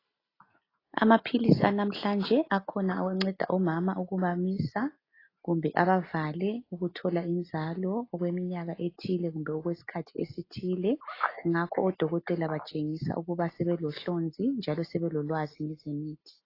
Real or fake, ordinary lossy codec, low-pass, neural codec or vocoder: real; AAC, 24 kbps; 5.4 kHz; none